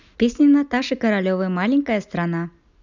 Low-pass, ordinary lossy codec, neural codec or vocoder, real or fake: 7.2 kHz; none; none; real